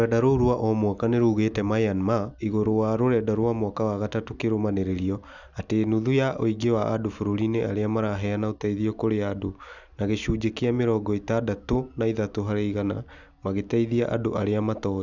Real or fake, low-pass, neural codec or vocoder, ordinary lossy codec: real; 7.2 kHz; none; none